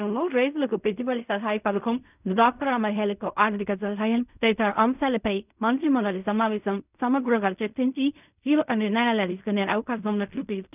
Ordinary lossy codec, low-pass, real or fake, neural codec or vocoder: none; 3.6 kHz; fake; codec, 16 kHz in and 24 kHz out, 0.4 kbps, LongCat-Audio-Codec, fine tuned four codebook decoder